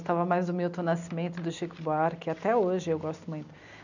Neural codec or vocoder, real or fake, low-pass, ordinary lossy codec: none; real; 7.2 kHz; none